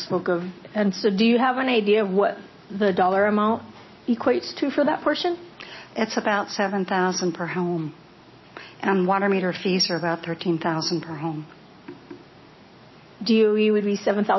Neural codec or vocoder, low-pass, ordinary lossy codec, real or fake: none; 7.2 kHz; MP3, 24 kbps; real